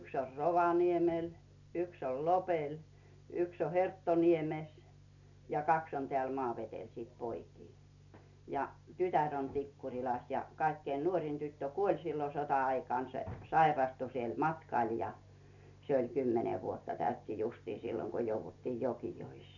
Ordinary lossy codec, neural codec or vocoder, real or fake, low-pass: none; none; real; 7.2 kHz